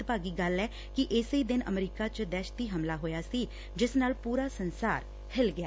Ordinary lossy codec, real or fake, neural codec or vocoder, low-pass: none; real; none; none